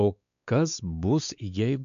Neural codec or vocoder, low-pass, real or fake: codec, 16 kHz, 4 kbps, X-Codec, WavLM features, trained on Multilingual LibriSpeech; 7.2 kHz; fake